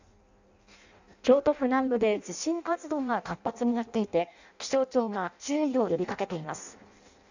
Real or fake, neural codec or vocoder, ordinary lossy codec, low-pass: fake; codec, 16 kHz in and 24 kHz out, 0.6 kbps, FireRedTTS-2 codec; none; 7.2 kHz